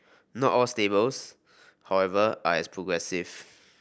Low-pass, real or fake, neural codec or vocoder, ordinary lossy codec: none; real; none; none